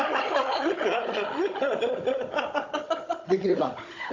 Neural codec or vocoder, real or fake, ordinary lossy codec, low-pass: codec, 16 kHz, 16 kbps, FunCodec, trained on Chinese and English, 50 frames a second; fake; AAC, 48 kbps; 7.2 kHz